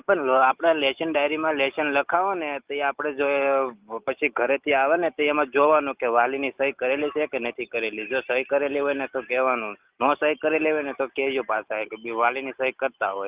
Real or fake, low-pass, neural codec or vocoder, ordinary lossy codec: real; 3.6 kHz; none; Opus, 24 kbps